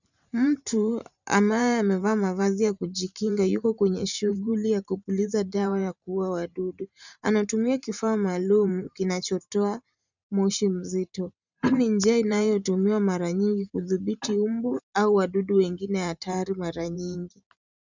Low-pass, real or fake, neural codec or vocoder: 7.2 kHz; fake; vocoder, 44.1 kHz, 128 mel bands every 512 samples, BigVGAN v2